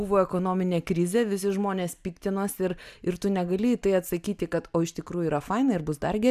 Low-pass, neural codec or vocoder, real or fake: 14.4 kHz; none; real